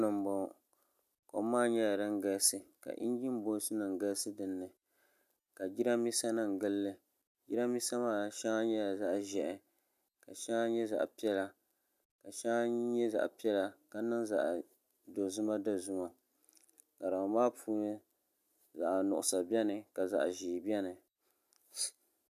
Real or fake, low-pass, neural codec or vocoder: real; 14.4 kHz; none